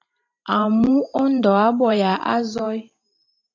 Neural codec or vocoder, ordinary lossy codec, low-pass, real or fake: vocoder, 44.1 kHz, 128 mel bands every 512 samples, BigVGAN v2; AAC, 48 kbps; 7.2 kHz; fake